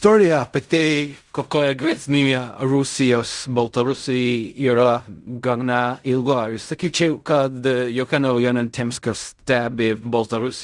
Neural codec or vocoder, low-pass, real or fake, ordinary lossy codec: codec, 16 kHz in and 24 kHz out, 0.4 kbps, LongCat-Audio-Codec, fine tuned four codebook decoder; 10.8 kHz; fake; Opus, 64 kbps